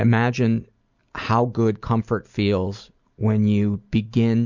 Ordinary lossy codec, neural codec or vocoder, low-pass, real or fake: Opus, 64 kbps; none; 7.2 kHz; real